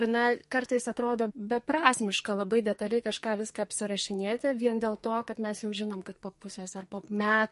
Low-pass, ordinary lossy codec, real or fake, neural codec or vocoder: 14.4 kHz; MP3, 48 kbps; fake; codec, 44.1 kHz, 2.6 kbps, SNAC